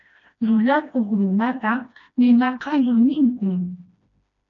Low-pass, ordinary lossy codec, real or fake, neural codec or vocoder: 7.2 kHz; MP3, 96 kbps; fake; codec, 16 kHz, 1 kbps, FreqCodec, smaller model